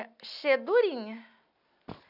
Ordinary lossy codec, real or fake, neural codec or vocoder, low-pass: none; real; none; 5.4 kHz